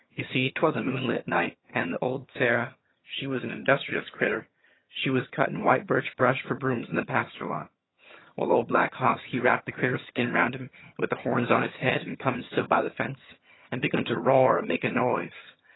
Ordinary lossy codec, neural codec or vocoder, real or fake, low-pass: AAC, 16 kbps; vocoder, 22.05 kHz, 80 mel bands, HiFi-GAN; fake; 7.2 kHz